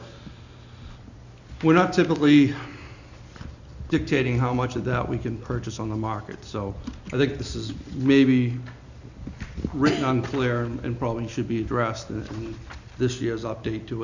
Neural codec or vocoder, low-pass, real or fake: none; 7.2 kHz; real